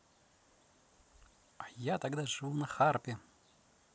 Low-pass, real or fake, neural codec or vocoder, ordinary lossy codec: none; real; none; none